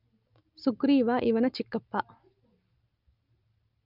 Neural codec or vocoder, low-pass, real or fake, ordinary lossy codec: none; 5.4 kHz; real; none